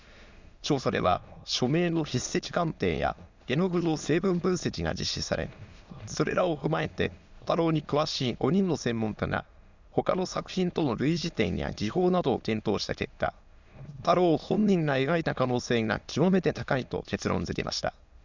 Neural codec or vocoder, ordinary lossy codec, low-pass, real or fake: autoencoder, 22.05 kHz, a latent of 192 numbers a frame, VITS, trained on many speakers; Opus, 64 kbps; 7.2 kHz; fake